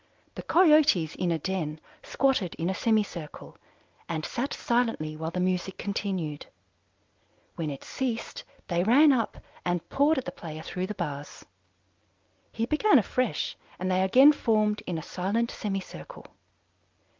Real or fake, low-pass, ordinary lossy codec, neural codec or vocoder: real; 7.2 kHz; Opus, 32 kbps; none